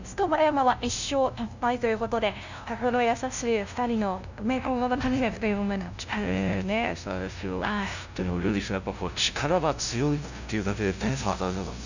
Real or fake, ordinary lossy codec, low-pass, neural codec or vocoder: fake; none; 7.2 kHz; codec, 16 kHz, 0.5 kbps, FunCodec, trained on LibriTTS, 25 frames a second